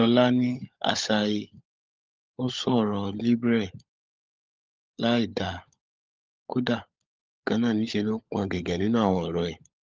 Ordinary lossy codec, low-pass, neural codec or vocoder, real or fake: Opus, 24 kbps; 7.2 kHz; codec, 16 kHz, 16 kbps, FunCodec, trained on LibriTTS, 50 frames a second; fake